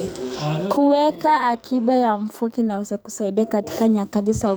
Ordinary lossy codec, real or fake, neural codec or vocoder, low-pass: none; fake; codec, 44.1 kHz, 2.6 kbps, SNAC; none